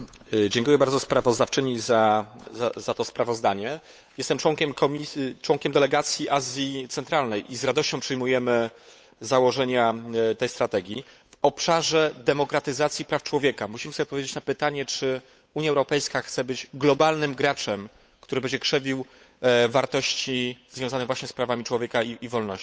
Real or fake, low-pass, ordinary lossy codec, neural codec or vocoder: fake; none; none; codec, 16 kHz, 8 kbps, FunCodec, trained on Chinese and English, 25 frames a second